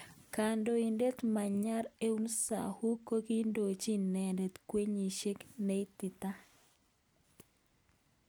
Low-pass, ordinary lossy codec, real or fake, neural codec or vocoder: none; none; real; none